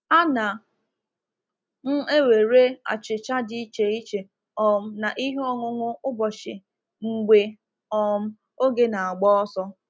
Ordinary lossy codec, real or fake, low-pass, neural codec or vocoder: none; real; none; none